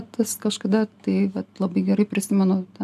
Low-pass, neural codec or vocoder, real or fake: 14.4 kHz; none; real